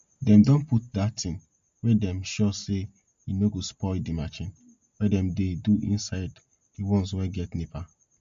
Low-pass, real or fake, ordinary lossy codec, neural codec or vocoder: 7.2 kHz; real; MP3, 48 kbps; none